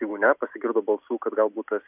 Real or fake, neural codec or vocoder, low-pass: real; none; 3.6 kHz